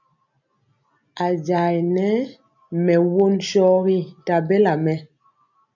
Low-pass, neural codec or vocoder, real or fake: 7.2 kHz; none; real